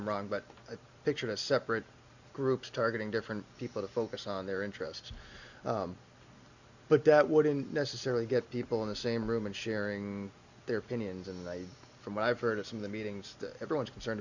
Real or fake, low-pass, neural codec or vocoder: real; 7.2 kHz; none